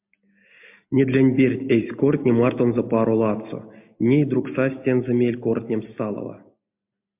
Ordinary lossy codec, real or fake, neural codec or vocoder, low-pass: MP3, 32 kbps; real; none; 3.6 kHz